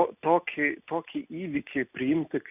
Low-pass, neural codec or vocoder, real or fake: 3.6 kHz; none; real